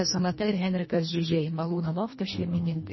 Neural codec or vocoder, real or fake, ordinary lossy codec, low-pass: codec, 24 kHz, 1.5 kbps, HILCodec; fake; MP3, 24 kbps; 7.2 kHz